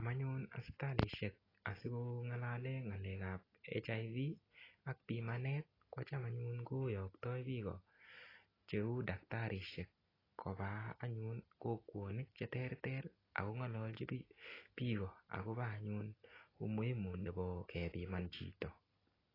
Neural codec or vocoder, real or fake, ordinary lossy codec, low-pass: none; real; AAC, 24 kbps; 5.4 kHz